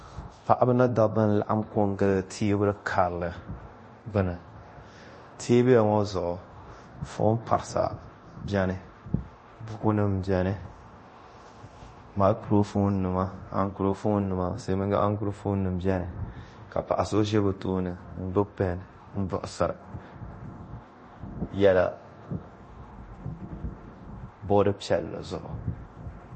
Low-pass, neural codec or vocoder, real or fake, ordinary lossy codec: 10.8 kHz; codec, 24 kHz, 0.9 kbps, DualCodec; fake; MP3, 32 kbps